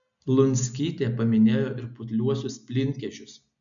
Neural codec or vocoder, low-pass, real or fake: none; 7.2 kHz; real